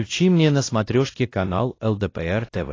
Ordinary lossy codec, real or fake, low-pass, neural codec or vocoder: AAC, 32 kbps; fake; 7.2 kHz; codec, 16 kHz, 1 kbps, X-Codec, WavLM features, trained on Multilingual LibriSpeech